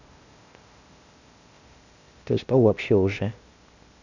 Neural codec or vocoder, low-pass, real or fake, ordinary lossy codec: codec, 16 kHz, 0.8 kbps, ZipCodec; 7.2 kHz; fake; none